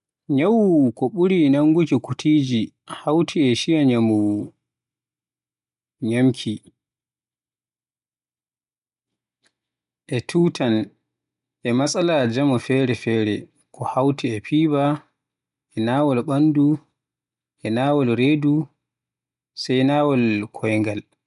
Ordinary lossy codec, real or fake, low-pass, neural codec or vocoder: none; real; 10.8 kHz; none